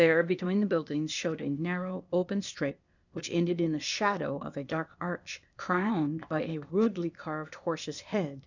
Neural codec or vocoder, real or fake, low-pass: codec, 16 kHz, 0.8 kbps, ZipCodec; fake; 7.2 kHz